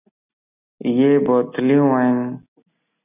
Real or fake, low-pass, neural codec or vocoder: real; 3.6 kHz; none